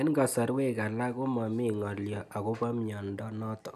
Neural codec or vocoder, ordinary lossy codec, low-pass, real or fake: none; none; 14.4 kHz; real